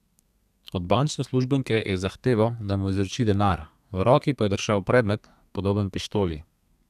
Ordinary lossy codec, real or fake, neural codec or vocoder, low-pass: none; fake; codec, 32 kHz, 1.9 kbps, SNAC; 14.4 kHz